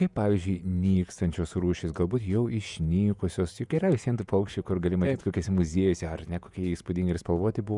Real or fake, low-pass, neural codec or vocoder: fake; 10.8 kHz; vocoder, 48 kHz, 128 mel bands, Vocos